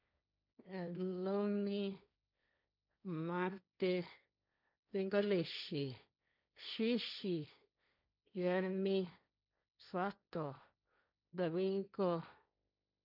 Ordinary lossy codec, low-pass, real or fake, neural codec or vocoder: none; 5.4 kHz; fake; codec, 16 kHz, 1.1 kbps, Voila-Tokenizer